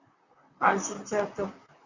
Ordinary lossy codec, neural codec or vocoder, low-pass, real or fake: Opus, 64 kbps; vocoder, 44.1 kHz, 128 mel bands, Pupu-Vocoder; 7.2 kHz; fake